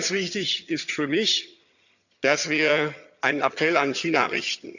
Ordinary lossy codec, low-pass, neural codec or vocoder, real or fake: none; 7.2 kHz; vocoder, 22.05 kHz, 80 mel bands, HiFi-GAN; fake